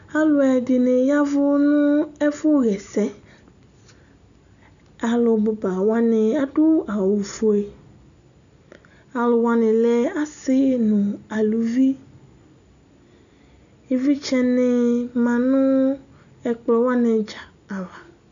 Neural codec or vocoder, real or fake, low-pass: none; real; 7.2 kHz